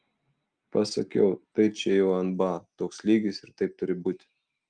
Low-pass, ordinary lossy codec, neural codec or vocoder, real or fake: 9.9 kHz; Opus, 32 kbps; none; real